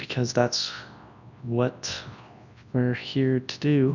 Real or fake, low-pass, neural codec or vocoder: fake; 7.2 kHz; codec, 24 kHz, 0.9 kbps, WavTokenizer, large speech release